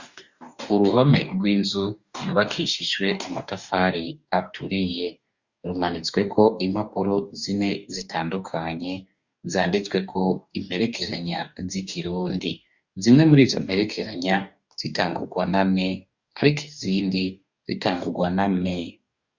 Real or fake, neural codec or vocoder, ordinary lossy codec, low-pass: fake; codec, 44.1 kHz, 2.6 kbps, DAC; Opus, 64 kbps; 7.2 kHz